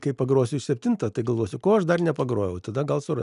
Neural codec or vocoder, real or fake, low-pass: none; real; 10.8 kHz